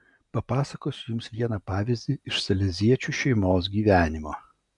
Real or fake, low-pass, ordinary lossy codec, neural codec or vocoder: real; 10.8 kHz; MP3, 96 kbps; none